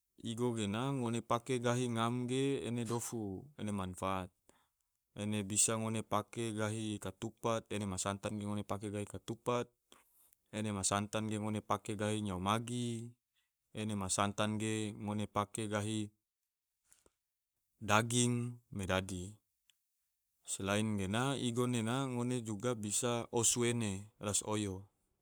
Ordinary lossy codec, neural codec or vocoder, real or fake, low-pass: none; codec, 44.1 kHz, 7.8 kbps, Pupu-Codec; fake; none